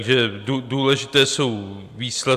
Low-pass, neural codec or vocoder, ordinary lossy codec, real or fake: 14.4 kHz; none; AAC, 96 kbps; real